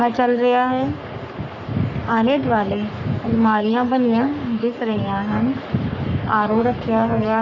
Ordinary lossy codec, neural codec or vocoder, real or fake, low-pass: none; codec, 44.1 kHz, 3.4 kbps, Pupu-Codec; fake; 7.2 kHz